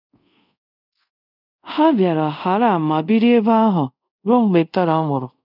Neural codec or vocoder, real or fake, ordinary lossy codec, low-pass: codec, 24 kHz, 0.5 kbps, DualCodec; fake; none; 5.4 kHz